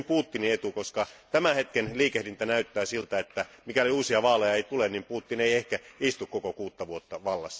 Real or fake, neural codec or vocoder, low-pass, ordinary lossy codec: real; none; none; none